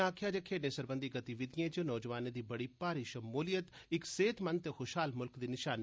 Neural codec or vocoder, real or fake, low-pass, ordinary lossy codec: none; real; none; none